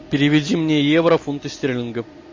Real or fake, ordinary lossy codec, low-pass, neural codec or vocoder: real; MP3, 32 kbps; 7.2 kHz; none